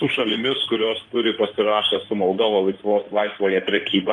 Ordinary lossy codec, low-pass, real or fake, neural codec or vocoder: Opus, 32 kbps; 9.9 kHz; fake; codec, 16 kHz in and 24 kHz out, 2.2 kbps, FireRedTTS-2 codec